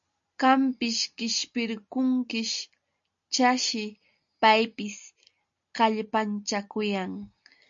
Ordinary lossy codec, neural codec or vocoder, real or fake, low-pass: MP3, 48 kbps; none; real; 7.2 kHz